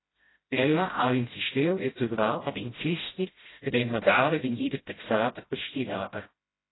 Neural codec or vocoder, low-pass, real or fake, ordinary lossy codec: codec, 16 kHz, 0.5 kbps, FreqCodec, smaller model; 7.2 kHz; fake; AAC, 16 kbps